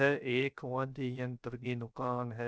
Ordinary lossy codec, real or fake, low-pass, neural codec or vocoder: none; fake; none; codec, 16 kHz, 0.3 kbps, FocalCodec